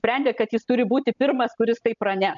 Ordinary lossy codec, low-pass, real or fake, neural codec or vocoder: MP3, 96 kbps; 7.2 kHz; real; none